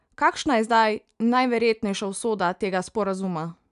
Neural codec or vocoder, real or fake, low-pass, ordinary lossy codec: none; real; 10.8 kHz; none